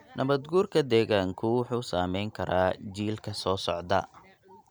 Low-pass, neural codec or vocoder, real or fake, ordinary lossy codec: none; none; real; none